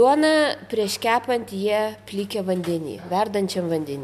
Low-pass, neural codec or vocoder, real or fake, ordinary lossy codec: 14.4 kHz; none; real; MP3, 96 kbps